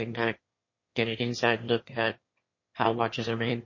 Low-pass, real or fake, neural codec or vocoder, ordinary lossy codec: 7.2 kHz; fake; autoencoder, 22.05 kHz, a latent of 192 numbers a frame, VITS, trained on one speaker; MP3, 32 kbps